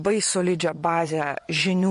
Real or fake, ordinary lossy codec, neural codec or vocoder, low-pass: fake; MP3, 48 kbps; vocoder, 24 kHz, 100 mel bands, Vocos; 10.8 kHz